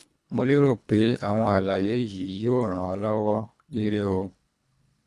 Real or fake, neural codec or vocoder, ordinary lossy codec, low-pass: fake; codec, 24 kHz, 1.5 kbps, HILCodec; none; none